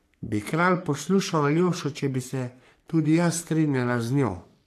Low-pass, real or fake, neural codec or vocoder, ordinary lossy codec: 14.4 kHz; fake; codec, 44.1 kHz, 3.4 kbps, Pupu-Codec; AAC, 64 kbps